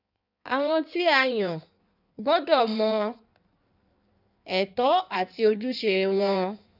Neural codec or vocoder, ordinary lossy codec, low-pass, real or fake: codec, 16 kHz in and 24 kHz out, 1.1 kbps, FireRedTTS-2 codec; none; 5.4 kHz; fake